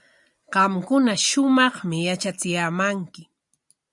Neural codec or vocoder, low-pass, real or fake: none; 10.8 kHz; real